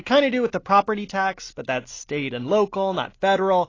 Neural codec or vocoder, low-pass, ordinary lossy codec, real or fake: none; 7.2 kHz; AAC, 32 kbps; real